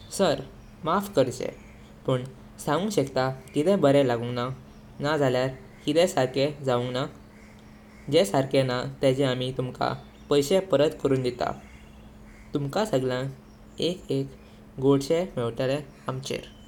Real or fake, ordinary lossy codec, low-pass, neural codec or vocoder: real; none; 19.8 kHz; none